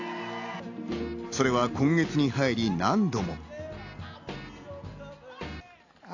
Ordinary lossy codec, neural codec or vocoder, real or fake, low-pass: AAC, 48 kbps; none; real; 7.2 kHz